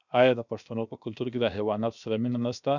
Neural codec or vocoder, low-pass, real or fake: codec, 16 kHz, 0.7 kbps, FocalCodec; 7.2 kHz; fake